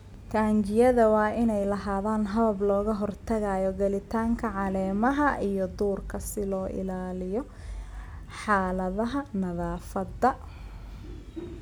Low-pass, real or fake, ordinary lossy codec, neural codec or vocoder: 19.8 kHz; real; none; none